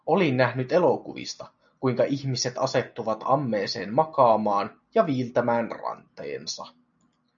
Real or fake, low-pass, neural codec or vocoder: real; 7.2 kHz; none